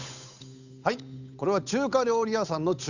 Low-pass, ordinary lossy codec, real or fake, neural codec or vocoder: 7.2 kHz; none; fake; codec, 16 kHz, 8 kbps, FunCodec, trained on Chinese and English, 25 frames a second